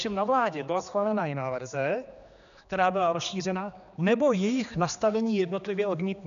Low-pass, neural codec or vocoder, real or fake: 7.2 kHz; codec, 16 kHz, 2 kbps, X-Codec, HuBERT features, trained on general audio; fake